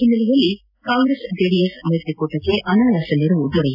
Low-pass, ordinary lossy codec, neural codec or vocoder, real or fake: 5.4 kHz; AAC, 48 kbps; none; real